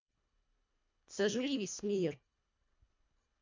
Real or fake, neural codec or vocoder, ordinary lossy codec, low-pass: fake; codec, 24 kHz, 1.5 kbps, HILCodec; MP3, 48 kbps; 7.2 kHz